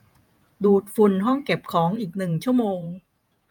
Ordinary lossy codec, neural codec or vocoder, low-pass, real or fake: none; vocoder, 48 kHz, 128 mel bands, Vocos; 19.8 kHz; fake